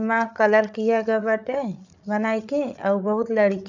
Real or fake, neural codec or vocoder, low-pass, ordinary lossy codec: fake; codec, 16 kHz, 8 kbps, FreqCodec, larger model; 7.2 kHz; none